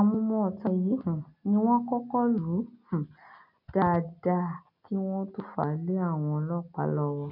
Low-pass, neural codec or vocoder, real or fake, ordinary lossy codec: 5.4 kHz; none; real; MP3, 48 kbps